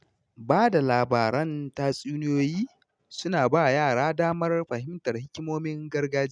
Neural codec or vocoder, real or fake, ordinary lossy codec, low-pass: none; real; none; 9.9 kHz